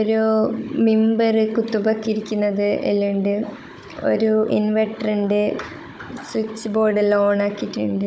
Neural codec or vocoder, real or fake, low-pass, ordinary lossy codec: codec, 16 kHz, 16 kbps, FunCodec, trained on Chinese and English, 50 frames a second; fake; none; none